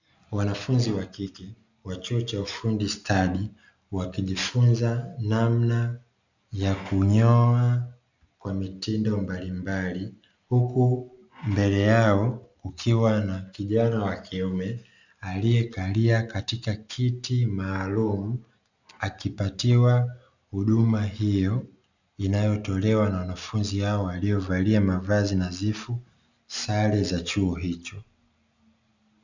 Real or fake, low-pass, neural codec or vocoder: real; 7.2 kHz; none